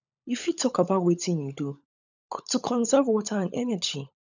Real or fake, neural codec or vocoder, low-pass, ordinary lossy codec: fake; codec, 16 kHz, 16 kbps, FunCodec, trained on LibriTTS, 50 frames a second; 7.2 kHz; none